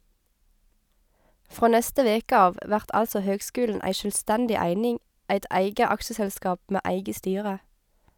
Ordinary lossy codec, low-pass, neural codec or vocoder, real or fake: none; none; none; real